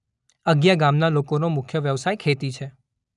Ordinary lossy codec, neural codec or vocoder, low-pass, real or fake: none; none; 10.8 kHz; real